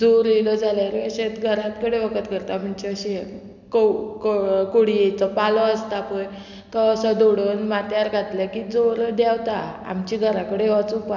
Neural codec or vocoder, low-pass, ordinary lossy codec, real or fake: none; 7.2 kHz; none; real